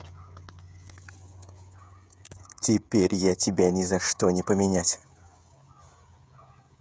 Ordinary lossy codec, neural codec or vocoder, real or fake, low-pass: none; codec, 16 kHz, 16 kbps, FreqCodec, smaller model; fake; none